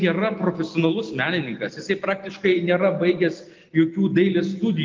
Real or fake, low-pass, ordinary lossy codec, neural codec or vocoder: real; 7.2 kHz; Opus, 32 kbps; none